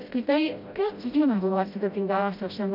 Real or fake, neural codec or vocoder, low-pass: fake; codec, 16 kHz, 0.5 kbps, FreqCodec, smaller model; 5.4 kHz